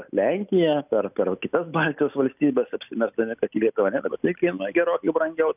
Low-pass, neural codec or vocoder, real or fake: 3.6 kHz; none; real